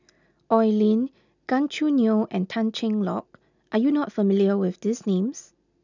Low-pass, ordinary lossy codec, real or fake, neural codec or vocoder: 7.2 kHz; none; real; none